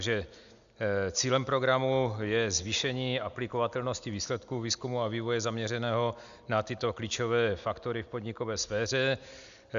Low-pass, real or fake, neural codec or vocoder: 7.2 kHz; real; none